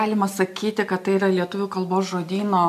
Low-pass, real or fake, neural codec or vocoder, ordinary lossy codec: 14.4 kHz; real; none; MP3, 96 kbps